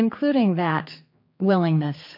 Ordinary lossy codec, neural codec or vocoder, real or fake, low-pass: MP3, 32 kbps; codec, 16 kHz, 4 kbps, X-Codec, HuBERT features, trained on general audio; fake; 5.4 kHz